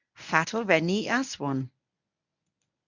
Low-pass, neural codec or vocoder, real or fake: 7.2 kHz; codec, 24 kHz, 0.9 kbps, WavTokenizer, medium speech release version 1; fake